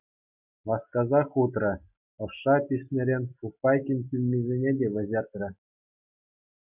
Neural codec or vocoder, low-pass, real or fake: none; 3.6 kHz; real